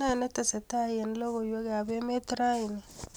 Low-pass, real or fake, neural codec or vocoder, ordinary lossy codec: none; real; none; none